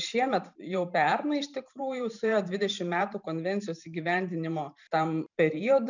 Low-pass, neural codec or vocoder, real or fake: 7.2 kHz; vocoder, 44.1 kHz, 128 mel bands every 512 samples, BigVGAN v2; fake